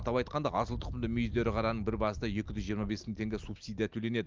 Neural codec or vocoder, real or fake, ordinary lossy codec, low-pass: none; real; Opus, 32 kbps; 7.2 kHz